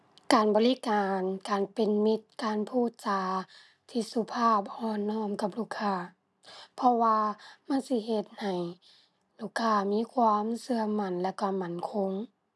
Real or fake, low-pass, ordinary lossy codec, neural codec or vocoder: real; none; none; none